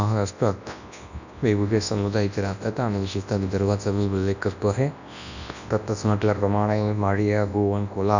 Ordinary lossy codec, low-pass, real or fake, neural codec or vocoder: none; 7.2 kHz; fake; codec, 24 kHz, 0.9 kbps, WavTokenizer, large speech release